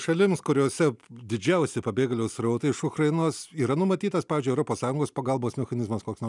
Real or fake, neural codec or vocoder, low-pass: real; none; 10.8 kHz